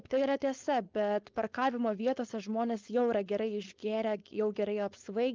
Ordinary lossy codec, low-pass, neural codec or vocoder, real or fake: Opus, 24 kbps; 7.2 kHz; codec, 16 kHz, 4.8 kbps, FACodec; fake